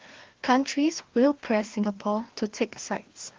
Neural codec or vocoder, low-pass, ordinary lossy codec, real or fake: codec, 16 kHz, 2 kbps, FreqCodec, larger model; 7.2 kHz; Opus, 16 kbps; fake